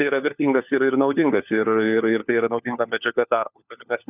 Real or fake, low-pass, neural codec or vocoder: fake; 3.6 kHz; codec, 16 kHz, 4 kbps, FunCodec, trained on LibriTTS, 50 frames a second